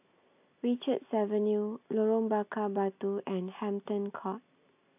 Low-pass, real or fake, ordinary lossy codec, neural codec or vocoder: 3.6 kHz; real; AAC, 32 kbps; none